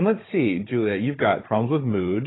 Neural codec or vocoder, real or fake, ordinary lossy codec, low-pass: codec, 16 kHz, 16 kbps, FunCodec, trained on Chinese and English, 50 frames a second; fake; AAC, 16 kbps; 7.2 kHz